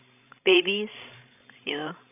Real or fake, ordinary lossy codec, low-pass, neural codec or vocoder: fake; none; 3.6 kHz; codec, 16 kHz, 16 kbps, FreqCodec, larger model